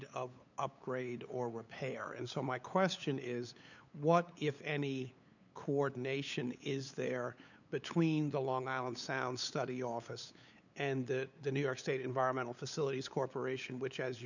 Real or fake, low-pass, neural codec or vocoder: fake; 7.2 kHz; codec, 16 kHz, 8 kbps, FunCodec, trained on LibriTTS, 25 frames a second